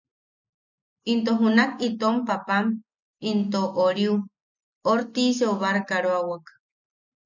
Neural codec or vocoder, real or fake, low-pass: none; real; 7.2 kHz